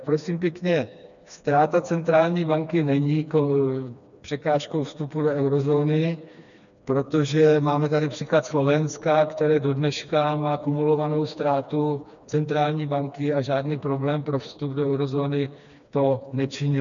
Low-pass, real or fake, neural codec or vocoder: 7.2 kHz; fake; codec, 16 kHz, 2 kbps, FreqCodec, smaller model